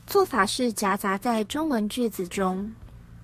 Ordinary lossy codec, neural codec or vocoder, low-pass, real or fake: MP3, 64 kbps; codec, 32 kHz, 1.9 kbps, SNAC; 14.4 kHz; fake